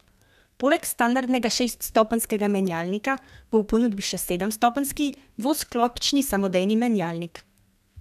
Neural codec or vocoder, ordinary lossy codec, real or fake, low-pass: codec, 32 kHz, 1.9 kbps, SNAC; none; fake; 14.4 kHz